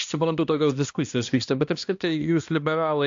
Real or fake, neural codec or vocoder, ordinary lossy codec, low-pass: fake; codec, 16 kHz, 1 kbps, X-Codec, HuBERT features, trained on balanced general audio; AAC, 64 kbps; 7.2 kHz